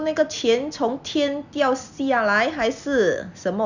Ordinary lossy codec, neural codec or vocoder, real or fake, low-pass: none; none; real; 7.2 kHz